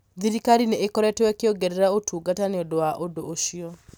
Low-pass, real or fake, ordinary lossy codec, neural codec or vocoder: none; real; none; none